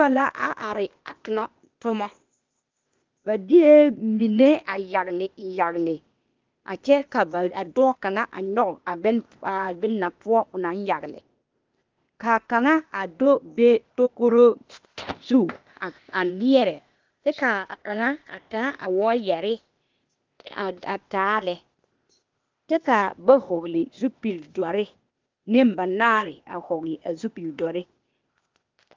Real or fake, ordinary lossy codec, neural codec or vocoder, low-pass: fake; Opus, 24 kbps; codec, 16 kHz, 0.8 kbps, ZipCodec; 7.2 kHz